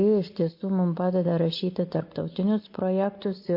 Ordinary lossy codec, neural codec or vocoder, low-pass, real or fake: MP3, 32 kbps; none; 5.4 kHz; real